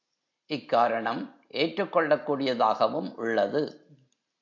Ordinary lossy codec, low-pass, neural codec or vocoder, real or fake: AAC, 48 kbps; 7.2 kHz; none; real